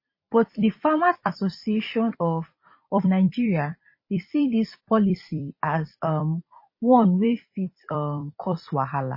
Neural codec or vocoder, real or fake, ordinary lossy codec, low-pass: vocoder, 44.1 kHz, 128 mel bands every 256 samples, BigVGAN v2; fake; MP3, 24 kbps; 5.4 kHz